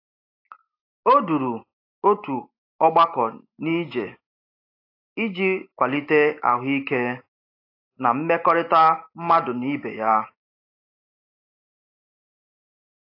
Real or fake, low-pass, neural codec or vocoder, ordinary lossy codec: real; 5.4 kHz; none; AAC, 32 kbps